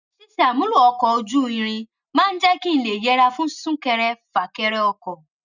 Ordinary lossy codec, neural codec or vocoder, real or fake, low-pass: none; none; real; 7.2 kHz